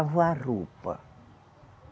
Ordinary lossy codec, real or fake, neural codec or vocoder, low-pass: none; real; none; none